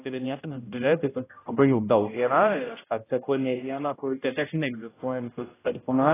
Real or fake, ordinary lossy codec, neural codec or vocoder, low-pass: fake; AAC, 16 kbps; codec, 16 kHz, 0.5 kbps, X-Codec, HuBERT features, trained on general audio; 3.6 kHz